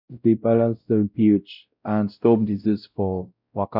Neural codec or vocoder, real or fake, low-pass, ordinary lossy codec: codec, 16 kHz, 0.5 kbps, X-Codec, WavLM features, trained on Multilingual LibriSpeech; fake; 5.4 kHz; none